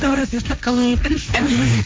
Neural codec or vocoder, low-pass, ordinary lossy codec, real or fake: codec, 16 kHz, 1.1 kbps, Voila-Tokenizer; none; none; fake